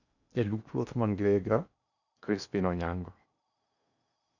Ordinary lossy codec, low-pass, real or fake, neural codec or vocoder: none; 7.2 kHz; fake; codec, 16 kHz in and 24 kHz out, 0.8 kbps, FocalCodec, streaming, 65536 codes